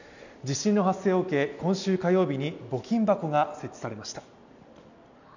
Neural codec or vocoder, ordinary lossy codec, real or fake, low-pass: none; none; real; 7.2 kHz